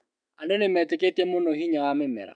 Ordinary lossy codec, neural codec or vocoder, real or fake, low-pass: none; autoencoder, 48 kHz, 128 numbers a frame, DAC-VAE, trained on Japanese speech; fake; 9.9 kHz